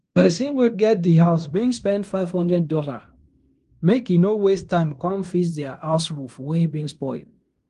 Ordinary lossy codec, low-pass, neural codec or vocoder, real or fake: Opus, 24 kbps; 10.8 kHz; codec, 16 kHz in and 24 kHz out, 0.9 kbps, LongCat-Audio-Codec, fine tuned four codebook decoder; fake